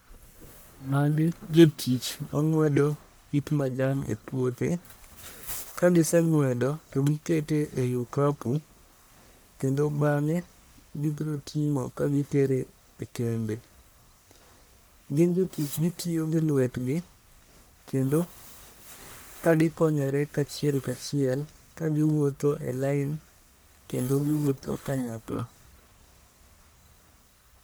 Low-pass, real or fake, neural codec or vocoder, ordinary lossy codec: none; fake; codec, 44.1 kHz, 1.7 kbps, Pupu-Codec; none